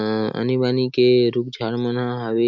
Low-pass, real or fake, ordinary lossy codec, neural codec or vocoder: 7.2 kHz; real; MP3, 64 kbps; none